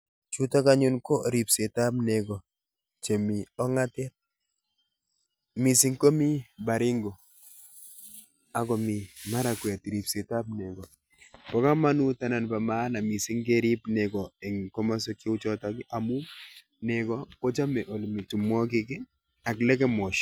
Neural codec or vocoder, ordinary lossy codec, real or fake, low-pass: none; none; real; none